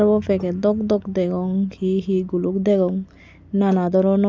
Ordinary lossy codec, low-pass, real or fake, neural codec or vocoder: none; none; real; none